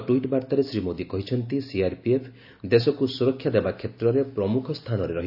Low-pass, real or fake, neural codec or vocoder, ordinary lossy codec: 5.4 kHz; real; none; none